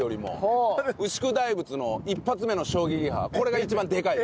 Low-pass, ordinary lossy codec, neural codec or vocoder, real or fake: none; none; none; real